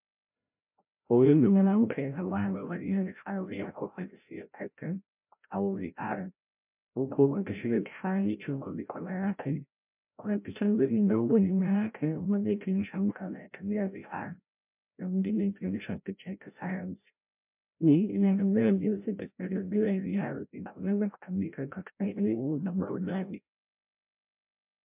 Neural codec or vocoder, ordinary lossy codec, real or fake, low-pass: codec, 16 kHz, 0.5 kbps, FreqCodec, larger model; MP3, 32 kbps; fake; 3.6 kHz